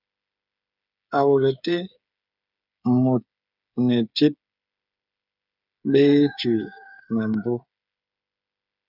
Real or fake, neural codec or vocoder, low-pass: fake; codec, 16 kHz, 8 kbps, FreqCodec, smaller model; 5.4 kHz